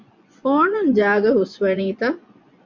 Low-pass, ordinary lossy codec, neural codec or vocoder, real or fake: 7.2 kHz; MP3, 64 kbps; vocoder, 24 kHz, 100 mel bands, Vocos; fake